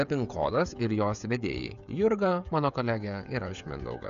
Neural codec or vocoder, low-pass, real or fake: codec, 16 kHz, 16 kbps, FreqCodec, smaller model; 7.2 kHz; fake